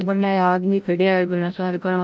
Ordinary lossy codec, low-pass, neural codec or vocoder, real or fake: none; none; codec, 16 kHz, 0.5 kbps, FreqCodec, larger model; fake